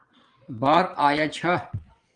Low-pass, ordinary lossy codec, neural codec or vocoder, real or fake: 9.9 kHz; Opus, 24 kbps; vocoder, 22.05 kHz, 80 mel bands, Vocos; fake